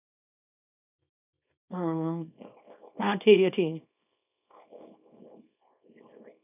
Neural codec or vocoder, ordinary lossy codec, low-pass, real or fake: codec, 24 kHz, 0.9 kbps, WavTokenizer, small release; none; 3.6 kHz; fake